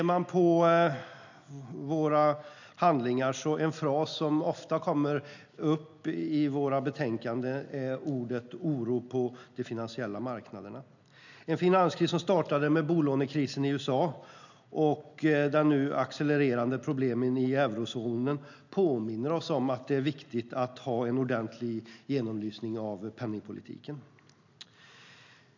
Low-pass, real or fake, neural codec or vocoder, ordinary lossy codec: 7.2 kHz; real; none; none